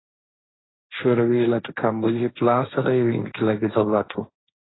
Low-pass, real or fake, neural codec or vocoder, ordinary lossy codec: 7.2 kHz; fake; codec, 16 kHz, 1.1 kbps, Voila-Tokenizer; AAC, 16 kbps